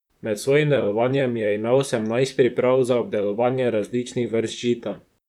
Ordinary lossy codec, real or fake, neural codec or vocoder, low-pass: none; fake; vocoder, 44.1 kHz, 128 mel bands, Pupu-Vocoder; 19.8 kHz